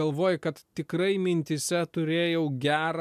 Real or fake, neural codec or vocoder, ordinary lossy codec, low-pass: real; none; MP3, 96 kbps; 14.4 kHz